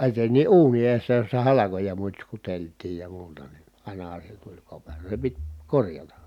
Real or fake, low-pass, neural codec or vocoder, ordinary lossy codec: real; 19.8 kHz; none; none